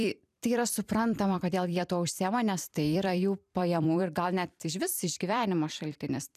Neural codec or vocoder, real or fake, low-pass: vocoder, 44.1 kHz, 128 mel bands every 256 samples, BigVGAN v2; fake; 14.4 kHz